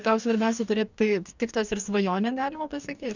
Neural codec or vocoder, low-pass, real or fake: codec, 44.1 kHz, 2.6 kbps, DAC; 7.2 kHz; fake